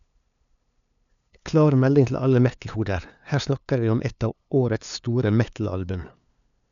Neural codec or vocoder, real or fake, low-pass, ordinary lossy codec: codec, 16 kHz, 2 kbps, FunCodec, trained on LibriTTS, 25 frames a second; fake; 7.2 kHz; none